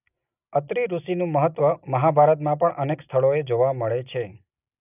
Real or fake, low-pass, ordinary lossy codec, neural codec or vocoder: real; 3.6 kHz; none; none